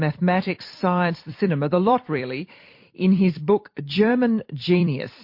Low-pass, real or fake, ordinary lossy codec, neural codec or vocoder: 5.4 kHz; fake; MP3, 32 kbps; vocoder, 44.1 kHz, 128 mel bands every 256 samples, BigVGAN v2